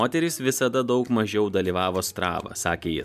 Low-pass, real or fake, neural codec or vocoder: 14.4 kHz; real; none